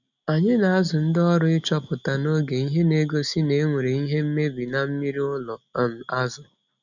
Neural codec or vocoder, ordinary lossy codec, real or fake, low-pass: none; none; real; 7.2 kHz